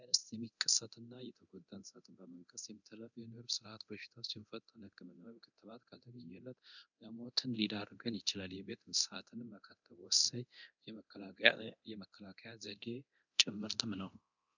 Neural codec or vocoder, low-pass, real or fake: codec, 24 kHz, 0.9 kbps, DualCodec; 7.2 kHz; fake